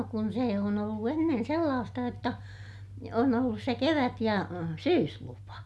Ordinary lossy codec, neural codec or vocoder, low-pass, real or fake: none; none; none; real